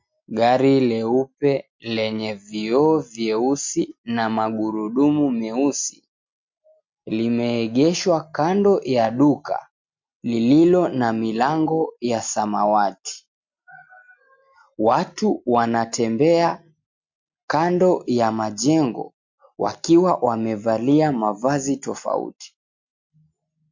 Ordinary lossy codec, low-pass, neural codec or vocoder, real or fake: MP3, 48 kbps; 7.2 kHz; none; real